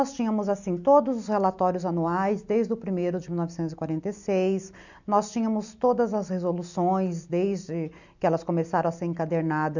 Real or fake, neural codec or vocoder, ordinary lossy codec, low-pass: real; none; none; 7.2 kHz